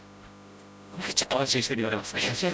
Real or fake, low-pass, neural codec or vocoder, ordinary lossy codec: fake; none; codec, 16 kHz, 0.5 kbps, FreqCodec, smaller model; none